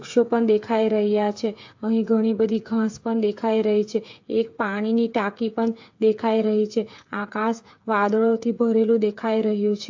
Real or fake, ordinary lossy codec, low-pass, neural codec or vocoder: fake; AAC, 48 kbps; 7.2 kHz; codec, 16 kHz, 8 kbps, FreqCodec, smaller model